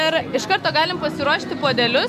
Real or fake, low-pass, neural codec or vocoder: real; 14.4 kHz; none